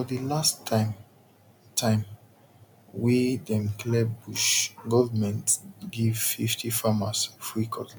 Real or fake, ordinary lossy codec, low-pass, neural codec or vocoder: real; none; none; none